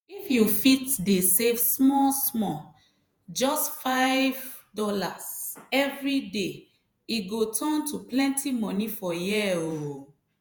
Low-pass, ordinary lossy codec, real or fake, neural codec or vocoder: none; none; fake; vocoder, 48 kHz, 128 mel bands, Vocos